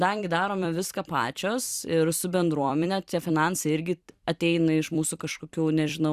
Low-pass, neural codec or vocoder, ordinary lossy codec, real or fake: 14.4 kHz; vocoder, 44.1 kHz, 128 mel bands every 256 samples, BigVGAN v2; Opus, 64 kbps; fake